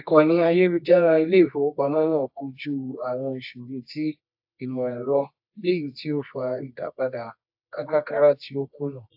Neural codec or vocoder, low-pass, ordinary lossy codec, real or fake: codec, 24 kHz, 0.9 kbps, WavTokenizer, medium music audio release; 5.4 kHz; AAC, 48 kbps; fake